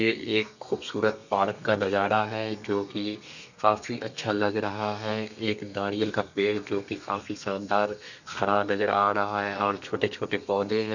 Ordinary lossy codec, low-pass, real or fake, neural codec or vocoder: none; 7.2 kHz; fake; codec, 32 kHz, 1.9 kbps, SNAC